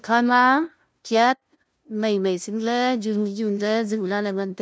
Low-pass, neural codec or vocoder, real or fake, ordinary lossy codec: none; codec, 16 kHz, 0.5 kbps, FunCodec, trained on LibriTTS, 25 frames a second; fake; none